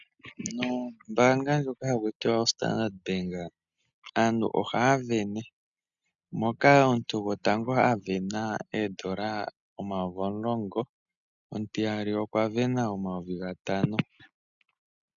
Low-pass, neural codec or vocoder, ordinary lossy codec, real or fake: 7.2 kHz; none; MP3, 96 kbps; real